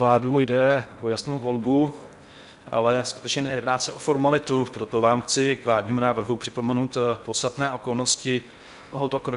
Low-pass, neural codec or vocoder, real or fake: 10.8 kHz; codec, 16 kHz in and 24 kHz out, 0.6 kbps, FocalCodec, streaming, 4096 codes; fake